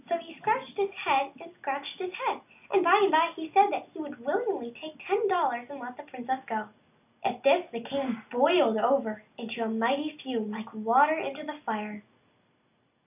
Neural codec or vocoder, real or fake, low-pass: none; real; 3.6 kHz